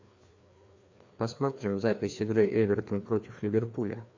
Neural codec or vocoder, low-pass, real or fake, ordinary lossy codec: codec, 16 kHz, 2 kbps, FreqCodec, larger model; 7.2 kHz; fake; MP3, 48 kbps